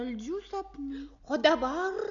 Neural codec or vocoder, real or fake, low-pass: none; real; 7.2 kHz